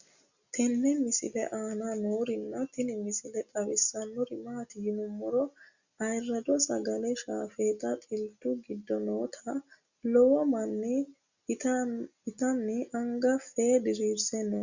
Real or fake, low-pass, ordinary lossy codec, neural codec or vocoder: real; 7.2 kHz; Opus, 64 kbps; none